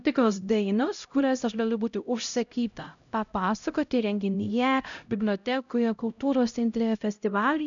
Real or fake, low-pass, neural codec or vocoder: fake; 7.2 kHz; codec, 16 kHz, 0.5 kbps, X-Codec, HuBERT features, trained on LibriSpeech